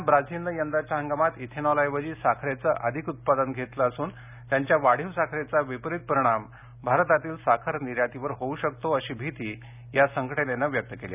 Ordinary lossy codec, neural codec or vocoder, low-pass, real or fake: none; none; 3.6 kHz; real